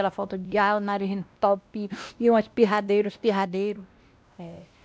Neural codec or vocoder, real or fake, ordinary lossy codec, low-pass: codec, 16 kHz, 1 kbps, X-Codec, WavLM features, trained on Multilingual LibriSpeech; fake; none; none